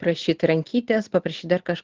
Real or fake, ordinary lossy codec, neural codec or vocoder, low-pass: real; Opus, 16 kbps; none; 7.2 kHz